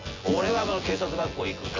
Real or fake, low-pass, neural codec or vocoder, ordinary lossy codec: fake; 7.2 kHz; vocoder, 24 kHz, 100 mel bands, Vocos; AAC, 32 kbps